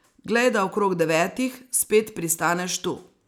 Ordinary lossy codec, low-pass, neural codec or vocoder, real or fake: none; none; none; real